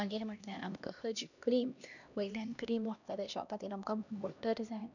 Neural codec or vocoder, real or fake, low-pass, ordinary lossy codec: codec, 16 kHz, 1 kbps, X-Codec, HuBERT features, trained on LibriSpeech; fake; 7.2 kHz; none